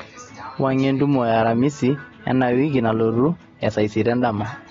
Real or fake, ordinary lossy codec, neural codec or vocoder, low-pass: real; AAC, 32 kbps; none; 7.2 kHz